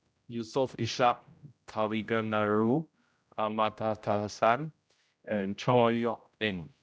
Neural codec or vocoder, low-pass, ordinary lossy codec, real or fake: codec, 16 kHz, 0.5 kbps, X-Codec, HuBERT features, trained on general audio; none; none; fake